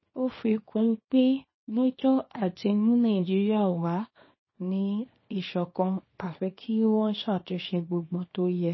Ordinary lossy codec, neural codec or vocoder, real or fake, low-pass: MP3, 24 kbps; codec, 24 kHz, 0.9 kbps, WavTokenizer, small release; fake; 7.2 kHz